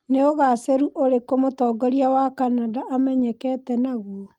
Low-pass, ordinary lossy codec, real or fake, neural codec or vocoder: 19.8 kHz; Opus, 32 kbps; real; none